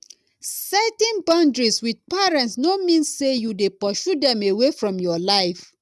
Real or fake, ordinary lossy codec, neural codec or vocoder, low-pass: real; none; none; none